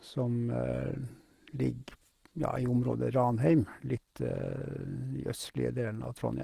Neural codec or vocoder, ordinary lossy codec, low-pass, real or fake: none; Opus, 16 kbps; 14.4 kHz; real